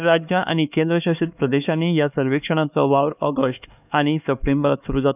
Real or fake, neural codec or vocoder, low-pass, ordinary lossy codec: fake; codec, 16 kHz, 4 kbps, X-Codec, HuBERT features, trained on LibriSpeech; 3.6 kHz; none